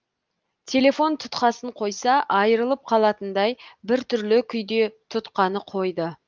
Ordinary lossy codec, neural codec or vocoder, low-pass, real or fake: Opus, 24 kbps; none; 7.2 kHz; real